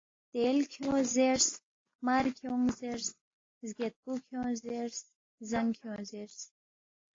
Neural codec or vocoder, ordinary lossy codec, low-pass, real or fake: none; AAC, 32 kbps; 7.2 kHz; real